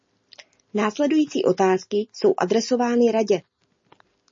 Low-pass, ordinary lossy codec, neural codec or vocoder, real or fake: 7.2 kHz; MP3, 32 kbps; none; real